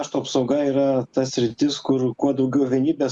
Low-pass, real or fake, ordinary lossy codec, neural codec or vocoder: 10.8 kHz; real; Opus, 64 kbps; none